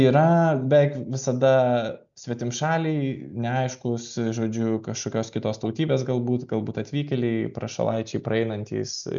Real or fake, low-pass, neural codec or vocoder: real; 7.2 kHz; none